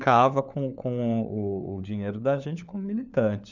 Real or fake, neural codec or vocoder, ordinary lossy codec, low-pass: fake; codec, 16 kHz, 4 kbps, FunCodec, trained on LibriTTS, 50 frames a second; none; 7.2 kHz